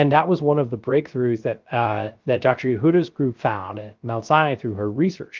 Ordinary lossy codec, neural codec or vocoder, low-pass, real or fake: Opus, 32 kbps; codec, 16 kHz, 0.3 kbps, FocalCodec; 7.2 kHz; fake